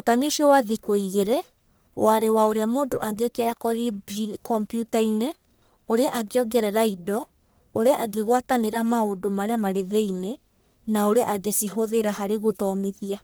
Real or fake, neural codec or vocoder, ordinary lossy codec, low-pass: fake; codec, 44.1 kHz, 1.7 kbps, Pupu-Codec; none; none